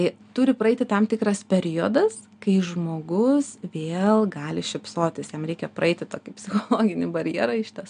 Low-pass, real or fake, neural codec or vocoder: 9.9 kHz; real; none